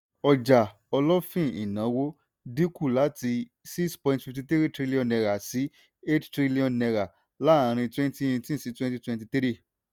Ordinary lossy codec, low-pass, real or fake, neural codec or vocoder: none; none; real; none